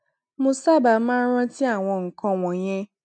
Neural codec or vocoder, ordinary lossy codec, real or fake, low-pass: none; none; real; 9.9 kHz